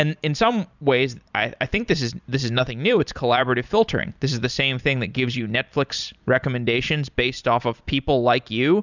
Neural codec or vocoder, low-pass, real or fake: vocoder, 44.1 kHz, 128 mel bands every 256 samples, BigVGAN v2; 7.2 kHz; fake